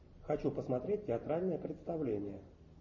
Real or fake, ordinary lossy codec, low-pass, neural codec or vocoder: real; MP3, 32 kbps; 7.2 kHz; none